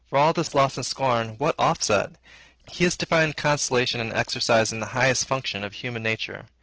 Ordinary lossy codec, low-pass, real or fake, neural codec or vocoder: Opus, 16 kbps; 7.2 kHz; real; none